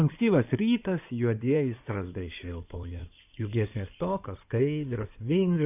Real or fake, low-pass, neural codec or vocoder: fake; 3.6 kHz; codec, 16 kHz in and 24 kHz out, 2.2 kbps, FireRedTTS-2 codec